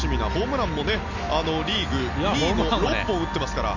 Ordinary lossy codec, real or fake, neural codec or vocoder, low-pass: none; real; none; 7.2 kHz